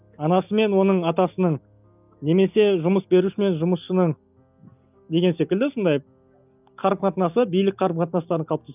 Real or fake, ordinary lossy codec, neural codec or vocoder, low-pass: real; none; none; 3.6 kHz